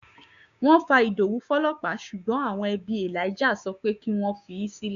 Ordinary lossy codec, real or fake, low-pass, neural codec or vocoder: none; fake; 7.2 kHz; codec, 16 kHz, 6 kbps, DAC